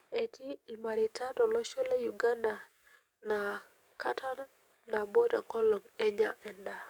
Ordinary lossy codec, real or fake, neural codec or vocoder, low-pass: none; fake; codec, 44.1 kHz, 7.8 kbps, DAC; 19.8 kHz